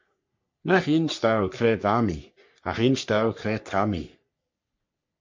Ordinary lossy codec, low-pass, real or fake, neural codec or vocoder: MP3, 48 kbps; 7.2 kHz; fake; codec, 44.1 kHz, 3.4 kbps, Pupu-Codec